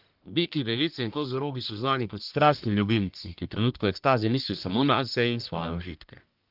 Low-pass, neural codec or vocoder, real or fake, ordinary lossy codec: 5.4 kHz; codec, 44.1 kHz, 1.7 kbps, Pupu-Codec; fake; Opus, 32 kbps